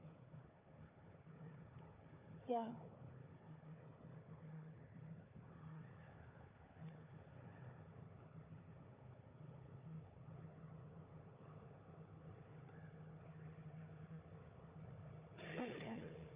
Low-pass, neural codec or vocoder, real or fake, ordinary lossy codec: 3.6 kHz; codec, 16 kHz, 4 kbps, FunCodec, trained on Chinese and English, 50 frames a second; fake; Opus, 64 kbps